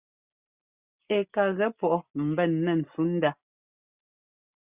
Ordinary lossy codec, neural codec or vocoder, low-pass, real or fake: Opus, 64 kbps; none; 3.6 kHz; real